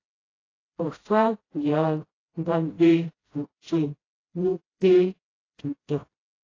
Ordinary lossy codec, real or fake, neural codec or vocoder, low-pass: AAC, 32 kbps; fake; codec, 16 kHz, 0.5 kbps, FreqCodec, smaller model; 7.2 kHz